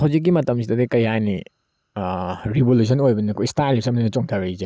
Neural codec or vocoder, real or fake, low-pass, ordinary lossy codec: none; real; none; none